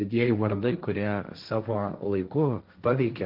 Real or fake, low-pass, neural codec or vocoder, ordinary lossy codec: fake; 5.4 kHz; codec, 16 kHz, 1.1 kbps, Voila-Tokenizer; Opus, 32 kbps